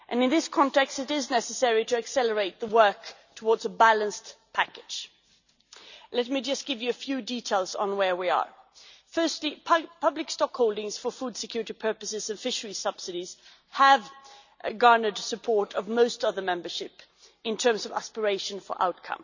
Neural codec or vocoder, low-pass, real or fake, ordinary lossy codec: none; 7.2 kHz; real; none